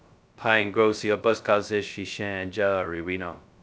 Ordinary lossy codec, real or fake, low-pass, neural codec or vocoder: none; fake; none; codec, 16 kHz, 0.2 kbps, FocalCodec